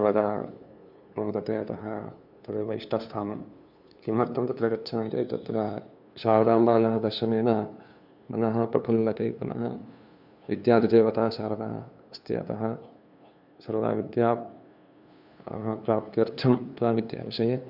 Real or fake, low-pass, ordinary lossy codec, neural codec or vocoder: fake; 5.4 kHz; none; codec, 16 kHz, 2 kbps, FunCodec, trained on LibriTTS, 25 frames a second